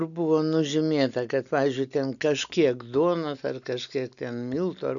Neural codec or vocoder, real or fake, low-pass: none; real; 7.2 kHz